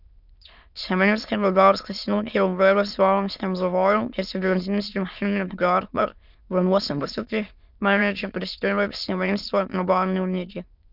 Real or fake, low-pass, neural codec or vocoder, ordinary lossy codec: fake; 5.4 kHz; autoencoder, 22.05 kHz, a latent of 192 numbers a frame, VITS, trained on many speakers; none